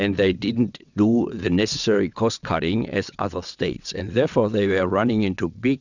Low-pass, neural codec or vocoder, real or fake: 7.2 kHz; vocoder, 22.05 kHz, 80 mel bands, WaveNeXt; fake